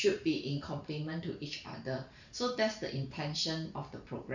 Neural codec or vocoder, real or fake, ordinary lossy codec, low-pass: vocoder, 44.1 kHz, 128 mel bands every 512 samples, BigVGAN v2; fake; none; 7.2 kHz